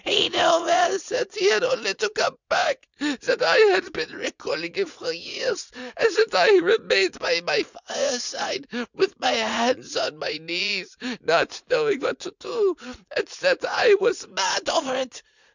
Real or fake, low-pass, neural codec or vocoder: fake; 7.2 kHz; vocoder, 44.1 kHz, 80 mel bands, Vocos